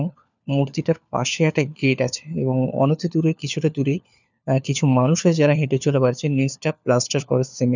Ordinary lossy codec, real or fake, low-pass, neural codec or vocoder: none; fake; 7.2 kHz; codec, 16 kHz, 4 kbps, FunCodec, trained on LibriTTS, 50 frames a second